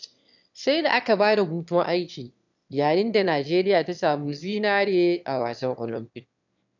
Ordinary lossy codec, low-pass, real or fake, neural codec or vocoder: none; 7.2 kHz; fake; autoencoder, 22.05 kHz, a latent of 192 numbers a frame, VITS, trained on one speaker